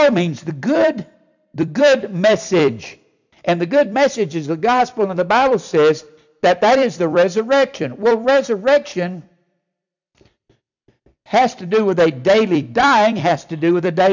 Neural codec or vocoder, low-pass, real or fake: vocoder, 44.1 kHz, 128 mel bands every 256 samples, BigVGAN v2; 7.2 kHz; fake